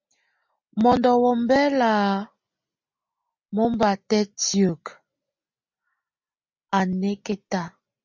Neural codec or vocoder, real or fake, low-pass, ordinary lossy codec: none; real; 7.2 kHz; MP3, 64 kbps